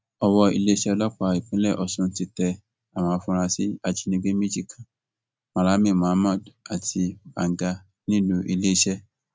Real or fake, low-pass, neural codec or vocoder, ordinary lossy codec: real; none; none; none